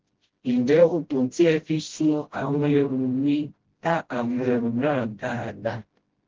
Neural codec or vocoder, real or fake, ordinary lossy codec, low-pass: codec, 16 kHz, 0.5 kbps, FreqCodec, smaller model; fake; Opus, 16 kbps; 7.2 kHz